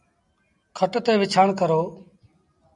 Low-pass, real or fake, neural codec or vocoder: 10.8 kHz; real; none